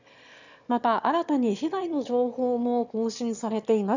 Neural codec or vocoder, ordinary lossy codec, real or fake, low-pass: autoencoder, 22.05 kHz, a latent of 192 numbers a frame, VITS, trained on one speaker; none; fake; 7.2 kHz